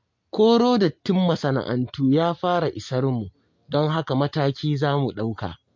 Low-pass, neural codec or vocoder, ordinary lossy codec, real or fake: 7.2 kHz; none; MP3, 48 kbps; real